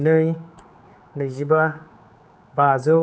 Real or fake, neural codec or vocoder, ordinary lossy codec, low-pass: fake; codec, 16 kHz, 4 kbps, X-Codec, HuBERT features, trained on general audio; none; none